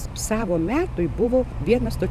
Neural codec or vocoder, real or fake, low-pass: vocoder, 44.1 kHz, 128 mel bands every 512 samples, BigVGAN v2; fake; 14.4 kHz